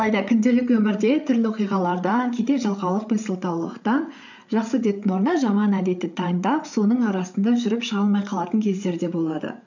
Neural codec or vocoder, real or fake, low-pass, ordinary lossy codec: codec, 16 kHz, 8 kbps, FreqCodec, larger model; fake; 7.2 kHz; none